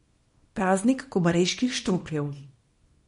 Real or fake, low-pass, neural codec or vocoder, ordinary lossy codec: fake; 10.8 kHz; codec, 24 kHz, 0.9 kbps, WavTokenizer, small release; MP3, 48 kbps